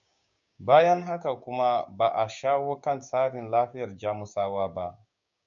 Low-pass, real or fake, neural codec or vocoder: 7.2 kHz; fake; codec, 16 kHz, 6 kbps, DAC